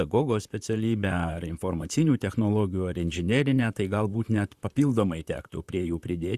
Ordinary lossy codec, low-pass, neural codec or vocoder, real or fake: Opus, 64 kbps; 14.4 kHz; vocoder, 44.1 kHz, 128 mel bands, Pupu-Vocoder; fake